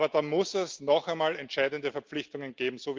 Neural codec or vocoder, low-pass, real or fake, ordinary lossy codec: none; 7.2 kHz; real; Opus, 32 kbps